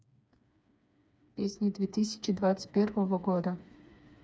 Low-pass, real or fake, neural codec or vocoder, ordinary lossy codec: none; fake; codec, 16 kHz, 4 kbps, FreqCodec, smaller model; none